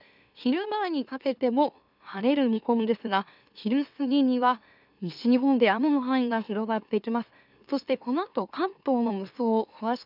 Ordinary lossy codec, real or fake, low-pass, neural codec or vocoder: none; fake; 5.4 kHz; autoencoder, 44.1 kHz, a latent of 192 numbers a frame, MeloTTS